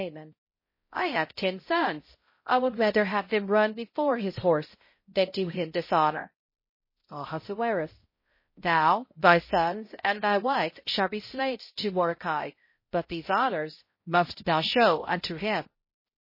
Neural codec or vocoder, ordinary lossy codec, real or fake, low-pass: codec, 16 kHz, 0.5 kbps, X-Codec, HuBERT features, trained on balanced general audio; MP3, 24 kbps; fake; 5.4 kHz